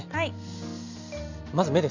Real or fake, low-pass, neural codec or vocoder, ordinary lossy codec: real; 7.2 kHz; none; none